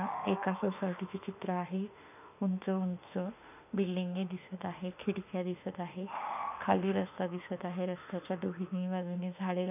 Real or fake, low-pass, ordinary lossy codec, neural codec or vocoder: fake; 3.6 kHz; none; autoencoder, 48 kHz, 32 numbers a frame, DAC-VAE, trained on Japanese speech